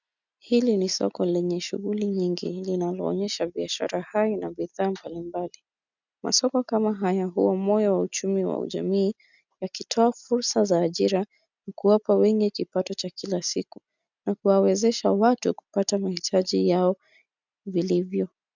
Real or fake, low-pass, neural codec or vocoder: real; 7.2 kHz; none